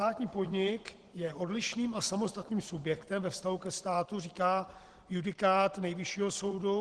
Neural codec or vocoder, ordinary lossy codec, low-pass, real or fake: vocoder, 44.1 kHz, 128 mel bands every 512 samples, BigVGAN v2; Opus, 16 kbps; 10.8 kHz; fake